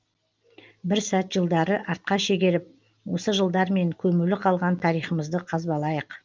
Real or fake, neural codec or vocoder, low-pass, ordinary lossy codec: real; none; 7.2 kHz; Opus, 32 kbps